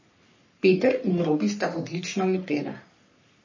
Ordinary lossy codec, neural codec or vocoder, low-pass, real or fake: MP3, 32 kbps; codec, 44.1 kHz, 3.4 kbps, Pupu-Codec; 7.2 kHz; fake